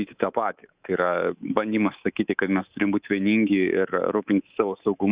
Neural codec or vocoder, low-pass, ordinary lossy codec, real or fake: codec, 24 kHz, 3.1 kbps, DualCodec; 3.6 kHz; Opus, 64 kbps; fake